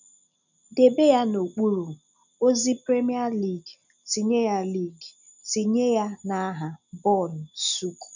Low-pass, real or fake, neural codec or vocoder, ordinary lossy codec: 7.2 kHz; real; none; none